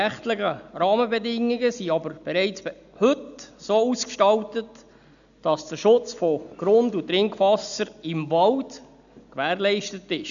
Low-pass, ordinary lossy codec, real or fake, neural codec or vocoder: 7.2 kHz; none; real; none